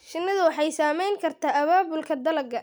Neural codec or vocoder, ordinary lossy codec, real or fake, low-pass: none; none; real; none